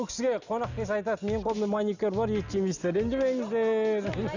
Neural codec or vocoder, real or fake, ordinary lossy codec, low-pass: none; real; none; 7.2 kHz